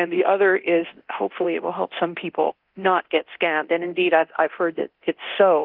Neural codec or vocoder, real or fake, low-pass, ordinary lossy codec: codec, 24 kHz, 0.9 kbps, DualCodec; fake; 5.4 kHz; Opus, 24 kbps